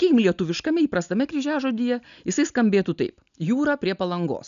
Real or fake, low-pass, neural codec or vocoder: real; 7.2 kHz; none